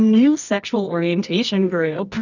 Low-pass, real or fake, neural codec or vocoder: 7.2 kHz; fake; codec, 24 kHz, 0.9 kbps, WavTokenizer, medium music audio release